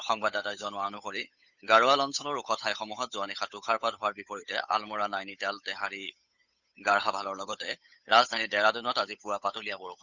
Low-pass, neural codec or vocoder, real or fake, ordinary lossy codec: 7.2 kHz; codec, 16 kHz, 8 kbps, FunCodec, trained on Chinese and English, 25 frames a second; fake; none